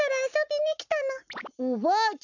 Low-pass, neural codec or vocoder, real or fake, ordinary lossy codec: 7.2 kHz; codec, 44.1 kHz, 7.8 kbps, Pupu-Codec; fake; none